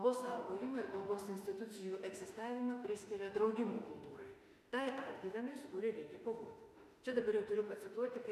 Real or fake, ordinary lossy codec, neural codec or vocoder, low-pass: fake; MP3, 96 kbps; autoencoder, 48 kHz, 32 numbers a frame, DAC-VAE, trained on Japanese speech; 14.4 kHz